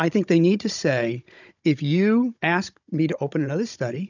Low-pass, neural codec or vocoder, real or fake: 7.2 kHz; codec, 16 kHz, 16 kbps, FunCodec, trained on Chinese and English, 50 frames a second; fake